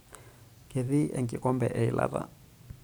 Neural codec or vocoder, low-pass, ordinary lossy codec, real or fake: vocoder, 44.1 kHz, 128 mel bands every 512 samples, BigVGAN v2; none; none; fake